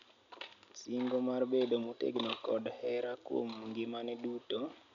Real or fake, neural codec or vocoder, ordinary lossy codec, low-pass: real; none; none; 7.2 kHz